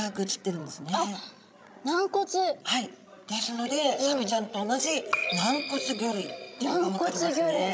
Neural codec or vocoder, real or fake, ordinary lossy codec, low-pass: codec, 16 kHz, 16 kbps, FreqCodec, larger model; fake; none; none